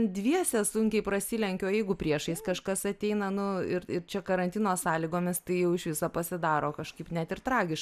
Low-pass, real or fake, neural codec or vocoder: 14.4 kHz; real; none